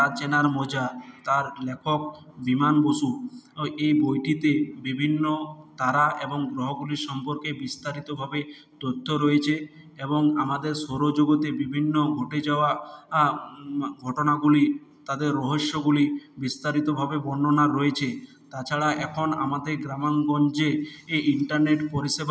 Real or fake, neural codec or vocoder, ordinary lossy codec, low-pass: real; none; none; none